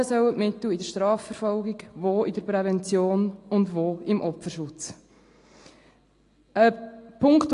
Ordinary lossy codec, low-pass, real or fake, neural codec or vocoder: AAC, 48 kbps; 10.8 kHz; real; none